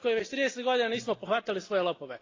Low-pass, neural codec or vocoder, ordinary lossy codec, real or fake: 7.2 kHz; none; AAC, 32 kbps; real